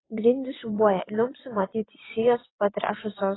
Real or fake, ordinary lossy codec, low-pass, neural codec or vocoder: fake; AAC, 16 kbps; 7.2 kHz; vocoder, 22.05 kHz, 80 mel bands, WaveNeXt